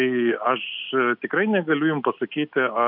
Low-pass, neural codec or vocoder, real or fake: 5.4 kHz; none; real